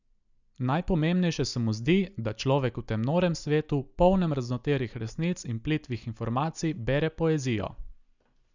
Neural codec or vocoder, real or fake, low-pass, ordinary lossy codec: none; real; 7.2 kHz; none